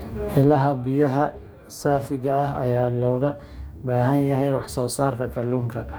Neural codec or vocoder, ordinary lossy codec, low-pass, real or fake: codec, 44.1 kHz, 2.6 kbps, DAC; none; none; fake